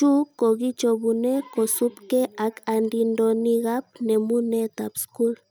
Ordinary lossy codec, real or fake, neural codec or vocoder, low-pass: none; real; none; none